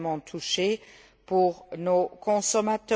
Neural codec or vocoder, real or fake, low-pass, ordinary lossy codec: none; real; none; none